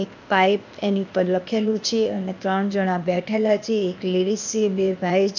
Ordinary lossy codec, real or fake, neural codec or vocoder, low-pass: none; fake; codec, 16 kHz, 0.8 kbps, ZipCodec; 7.2 kHz